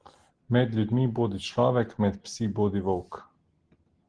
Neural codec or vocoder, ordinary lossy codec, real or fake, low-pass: none; Opus, 16 kbps; real; 9.9 kHz